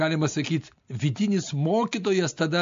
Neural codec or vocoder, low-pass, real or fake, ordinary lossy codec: none; 7.2 kHz; real; MP3, 48 kbps